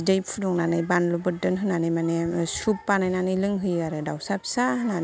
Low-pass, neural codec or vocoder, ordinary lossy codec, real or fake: none; none; none; real